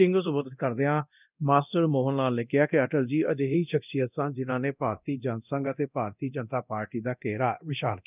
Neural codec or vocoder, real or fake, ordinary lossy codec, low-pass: codec, 24 kHz, 0.9 kbps, DualCodec; fake; none; 3.6 kHz